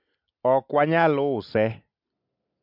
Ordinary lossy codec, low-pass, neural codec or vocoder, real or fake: MP3, 48 kbps; 5.4 kHz; none; real